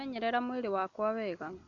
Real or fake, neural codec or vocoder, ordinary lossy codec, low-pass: real; none; none; 7.2 kHz